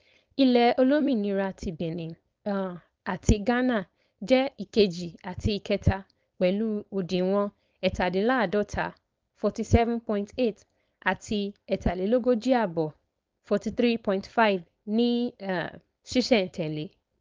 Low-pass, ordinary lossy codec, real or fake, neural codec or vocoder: 7.2 kHz; Opus, 24 kbps; fake; codec, 16 kHz, 4.8 kbps, FACodec